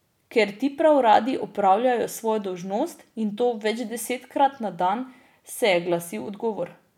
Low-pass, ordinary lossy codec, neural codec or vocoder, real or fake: 19.8 kHz; none; none; real